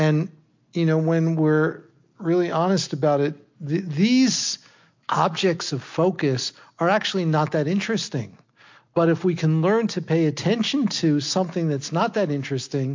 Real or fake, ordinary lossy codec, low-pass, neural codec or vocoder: real; MP3, 48 kbps; 7.2 kHz; none